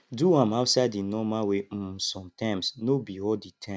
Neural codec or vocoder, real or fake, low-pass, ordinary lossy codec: none; real; none; none